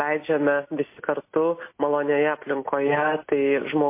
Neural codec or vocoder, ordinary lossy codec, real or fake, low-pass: none; MP3, 24 kbps; real; 3.6 kHz